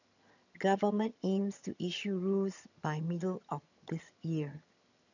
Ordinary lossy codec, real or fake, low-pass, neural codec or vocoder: none; fake; 7.2 kHz; vocoder, 22.05 kHz, 80 mel bands, HiFi-GAN